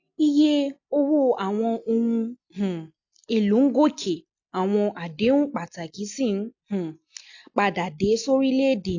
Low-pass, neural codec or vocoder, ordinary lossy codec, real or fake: 7.2 kHz; none; AAC, 48 kbps; real